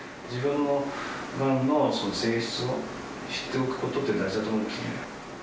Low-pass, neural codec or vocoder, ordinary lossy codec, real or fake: none; none; none; real